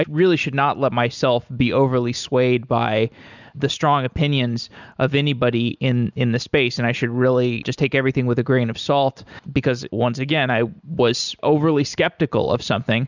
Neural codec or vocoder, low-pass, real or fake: none; 7.2 kHz; real